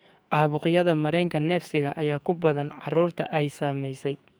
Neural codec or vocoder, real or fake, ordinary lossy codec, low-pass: codec, 44.1 kHz, 2.6 kbps, SNAC; fake; none; none